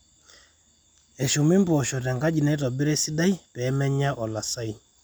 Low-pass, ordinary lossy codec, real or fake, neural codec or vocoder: none; none; real; none